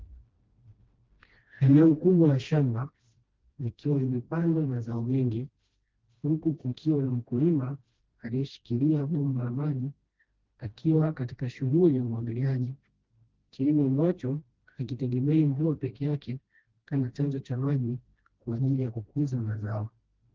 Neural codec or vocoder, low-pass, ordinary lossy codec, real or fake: codec, 16 kHz, 1 kbps, FreqCodec, smaller model; 7.2 kHz; Opus, 16 kbps; fake